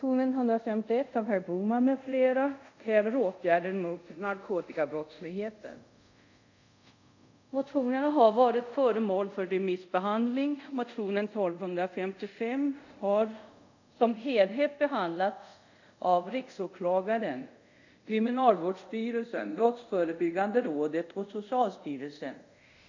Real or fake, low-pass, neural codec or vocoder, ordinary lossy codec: fake; 7.2 kHz; codec, 24 kHz, 0.5 kbps, DualCodec; none